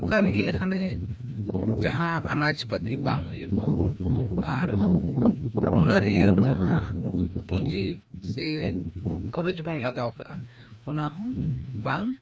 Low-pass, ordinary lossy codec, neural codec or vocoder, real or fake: none; none; codec, 16 kHz, 1 kbps, FreqCodec, larger model; fake